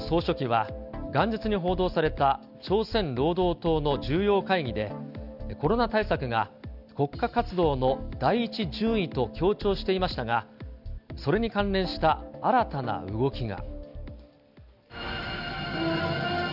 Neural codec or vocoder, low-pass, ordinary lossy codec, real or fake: none; 5.4 kHz; none; real